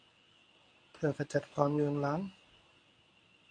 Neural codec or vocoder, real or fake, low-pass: codec, 24 kHz, 0.9 kbps, WavTokenizer, medium speech release version 1; fake; 9.9 kHz